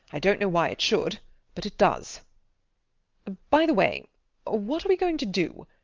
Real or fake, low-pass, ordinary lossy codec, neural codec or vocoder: real; 7.2 kHz; Opus, 32 kbps; none